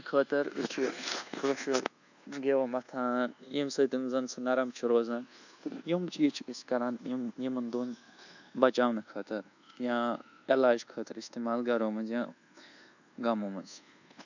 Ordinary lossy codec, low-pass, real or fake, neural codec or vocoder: none; 7.2 kHz; fake; codec, 24 kHz, 1.2 kbps, DualCodec